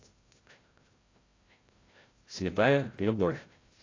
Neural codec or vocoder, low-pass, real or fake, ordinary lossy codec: codec, 16 kHz, 0.5 kbps, FreqCodec, larger model; 7.2 kHz; fake; AAC, 32 kbps